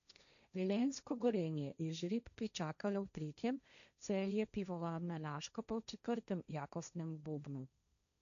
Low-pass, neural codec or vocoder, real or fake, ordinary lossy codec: 7.2 kHz; codec, 16 kHz, 1.1 kbps, Voila-Tokenizer; fake; none